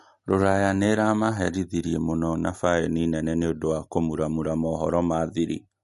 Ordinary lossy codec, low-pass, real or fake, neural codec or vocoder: MP3, 48 kbps; 14.4 kHz; real; none